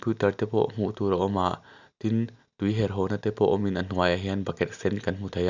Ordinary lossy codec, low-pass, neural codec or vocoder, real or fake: none; 7.2 kHz; none; real